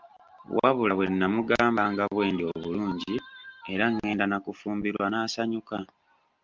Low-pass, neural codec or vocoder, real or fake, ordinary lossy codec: 7.2 kHz; none; real; Opus, 24 kbps